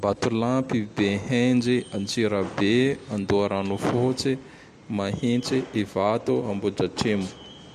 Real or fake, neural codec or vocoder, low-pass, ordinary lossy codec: real; none; 14.4 kHz; MP3, 64 kbps